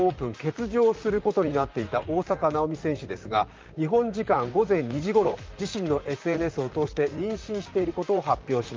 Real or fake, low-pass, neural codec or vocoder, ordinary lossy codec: fake; 7.2 kHz; vocoder, 44.1 kHz, 128 mel bands, Pupu-Vocoder; Opus, 24 kbps